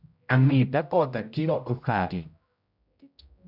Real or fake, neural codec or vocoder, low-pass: fake; codec, 16 kHz, 0.5 kbps, X-Codec, HuBERT features, trained on general audio; 5.4 kHz